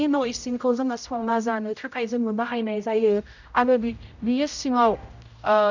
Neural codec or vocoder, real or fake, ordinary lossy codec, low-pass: codec, 16 kHz, 0.5 kbps, X-Codec, HuBERT features, trained on general audio; fake; none; 7.2 kHz